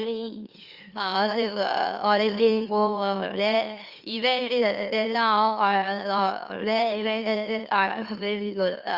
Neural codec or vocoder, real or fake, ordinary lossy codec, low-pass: autoencoder, 44.1 kHz, a latent of 192 numbers a frame, MeloTTS; fake; Opus, 32 kbps; 5.4 kHz